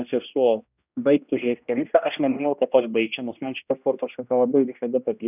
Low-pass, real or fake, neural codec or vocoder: 3.6 kHz; fake; codec, 16 kHz, 1 kbps, X-Codec, HuBERT features, trained on general audio